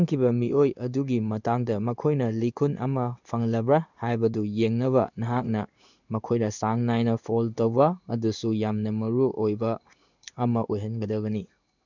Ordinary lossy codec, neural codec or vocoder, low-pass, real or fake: none; codec, 16 kHz in and 24 kHz out, 1 kbps, XY-Tokenizer; 7.2 kHz; fake